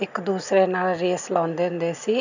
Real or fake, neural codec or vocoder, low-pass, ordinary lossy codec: real; none; 7.2 kHz; none